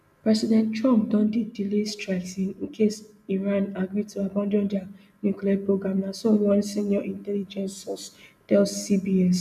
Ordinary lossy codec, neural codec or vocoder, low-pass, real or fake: none; none; 14.4 kHz; real